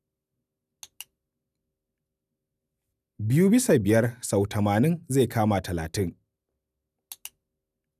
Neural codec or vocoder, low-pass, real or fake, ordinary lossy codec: none; 14.4 kHz; real; none